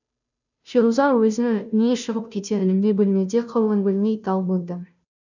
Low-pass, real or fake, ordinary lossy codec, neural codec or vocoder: 7.2 kHz; fake; none; codec, 16 kHz, 0.5 kbps, FunCodec, trained on Chinese and English, 25 frames a second